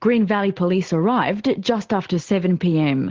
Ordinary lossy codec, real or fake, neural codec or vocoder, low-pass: Opus, 16 kbps; real; none; 7.2 kHz